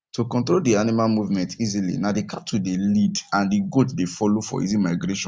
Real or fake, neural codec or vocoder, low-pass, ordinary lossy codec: real; none; none; none